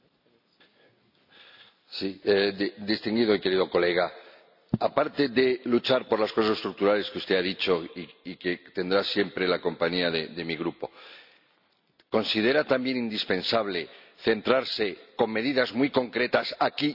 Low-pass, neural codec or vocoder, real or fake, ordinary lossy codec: 5.4 kHz; none; real; none